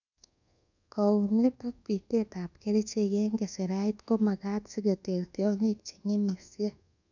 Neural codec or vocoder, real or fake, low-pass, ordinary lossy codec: codec, 24 kHz, 1.2 kbps, DualCodec; fake; 7.2 kHz; none